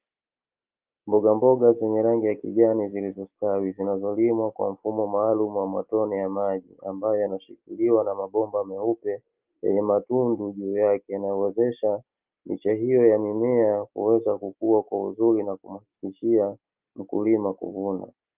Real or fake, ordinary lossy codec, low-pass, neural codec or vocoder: real; Opus, 32 kbps; 3.6 kHz; none